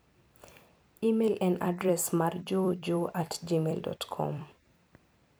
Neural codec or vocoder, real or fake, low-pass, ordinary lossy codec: vocoder, 44.1 kHz, 128 mel bands every 256 samples, BigVGAN v2; fake; none; none